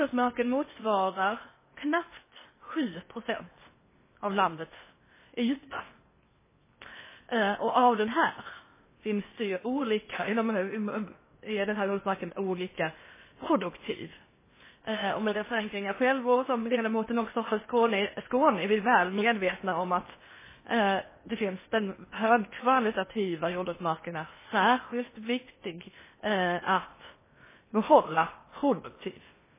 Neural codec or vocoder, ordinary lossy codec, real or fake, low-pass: codec, 16 kHz in and 24 kHz out, 0.8 kbps, FocalCodec, streaming, 65536 codes; MP3, 16 kbps; fake; 3.6 kHz